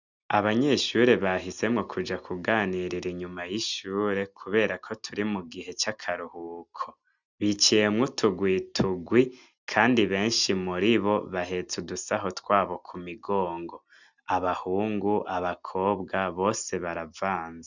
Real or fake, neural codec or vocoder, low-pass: real; none; 7.2 kHz